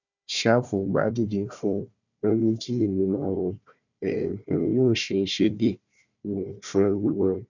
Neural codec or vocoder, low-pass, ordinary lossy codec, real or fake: codec, 16 kHz, 1 kbps, FunCodec, trained on Chinese and English, 50 frames a second; 7.2 kHz; none; fake